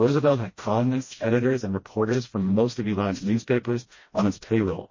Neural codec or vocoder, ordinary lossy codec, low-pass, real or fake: codec, 16 kHz, 1 kbps, FreqCodec, smaller model; MP3, 32 kbps; 7.2 kHz; fake